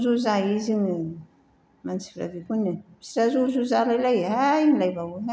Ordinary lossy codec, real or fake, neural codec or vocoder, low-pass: none; real; none; none